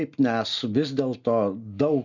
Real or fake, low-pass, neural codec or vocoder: real; 7.2 kHz; none